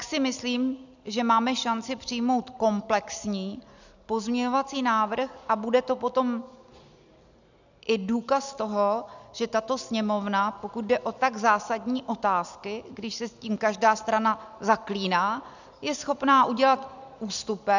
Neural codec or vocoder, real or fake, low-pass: none; real; 7.2 kHz